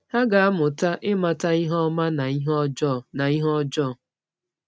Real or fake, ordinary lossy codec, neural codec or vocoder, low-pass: real; none; none; none